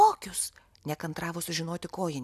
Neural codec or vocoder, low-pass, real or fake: none; 14.4 kHz; real